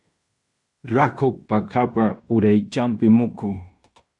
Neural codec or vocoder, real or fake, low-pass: codec, 16 kHz in and 24 kHz out, 0.9 kbps, LongCat-Audio-Codec, fine tuned four codebook decoder; fake; 10.8 kHz